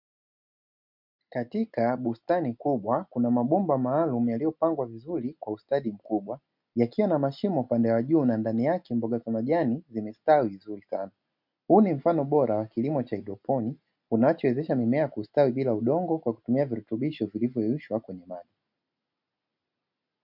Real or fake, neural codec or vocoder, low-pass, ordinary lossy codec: real; none; 5.4 kHz; MP3, 48 kbps